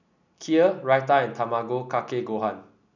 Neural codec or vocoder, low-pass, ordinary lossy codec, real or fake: none; 7.2 kHz; none; real